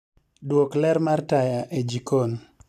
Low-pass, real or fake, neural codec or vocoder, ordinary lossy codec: 14.4 kHz; real; none; none